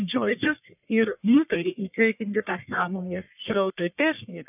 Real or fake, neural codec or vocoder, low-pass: fake; codec, 44.1 kHz, 1.7 kbps, Pupu-Codec; 3.6 kHz